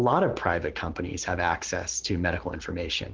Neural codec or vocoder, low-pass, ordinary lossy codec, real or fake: none; 7.2 kHz; Opus, 16 kbps; real